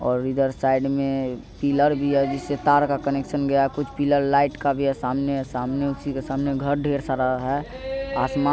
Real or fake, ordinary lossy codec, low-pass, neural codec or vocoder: real; none; none; none